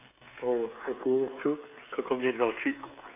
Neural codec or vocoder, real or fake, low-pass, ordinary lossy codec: codec, 16 kHz, 16 kbps, FreqCodec, smaller model; fake; 3.6 kHz; AAC, 24 kbps